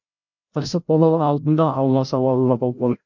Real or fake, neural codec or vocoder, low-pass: fake; codec, 16 kHz, 0.5 kbps, FreqCodec, larger model; 7.2 kHz